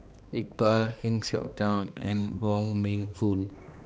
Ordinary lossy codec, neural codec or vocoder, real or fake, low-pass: none; codec, 16 kHz, 2 kbps, X-Codec, HuBERT features, trained on balanced general audio; fake; none